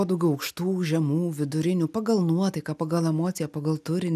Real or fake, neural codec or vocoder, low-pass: real; none; 14.4 kHz